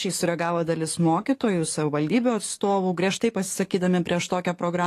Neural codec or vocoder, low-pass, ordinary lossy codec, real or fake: codec, 44.1 kHz, 7.8 kbps, DAC; 14.4 kHz; AAC, 48 kbps; fake